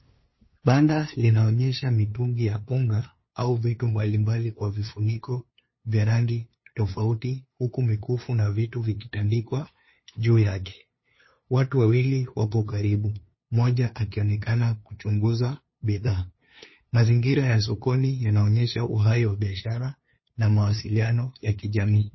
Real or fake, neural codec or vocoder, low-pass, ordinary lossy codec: fake; codec, 16 kHz, 2 kbps, FunCodec, trained on LibriTTS, 25 frames a second; 7.2 kHz; MP3, 24 kbps